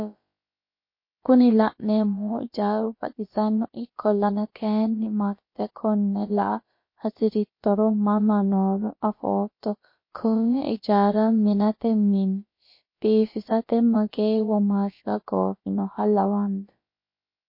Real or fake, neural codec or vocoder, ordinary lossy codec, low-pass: fake; codec, 16 kHz, about 1 kbps, DyCAST, with the encoder's durations; MP3, 32 kbps; 5.4 kHz